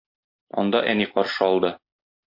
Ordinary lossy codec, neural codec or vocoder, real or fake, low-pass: MP3, 32 kbps; none; real; 5.4 kHz